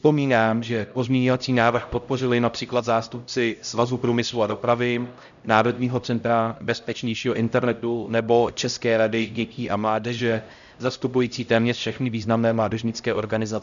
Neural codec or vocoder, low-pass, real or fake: codec, 16 kHz, 0.5 kbps, X-Codec, HuBERT features, trained on LibriSpeech; 7.2 kHz; fake